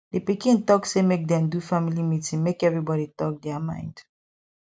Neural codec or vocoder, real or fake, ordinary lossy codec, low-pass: none; real; none; none